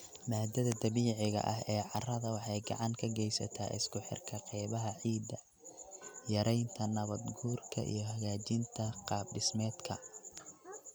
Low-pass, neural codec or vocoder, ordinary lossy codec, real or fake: none; none; none; real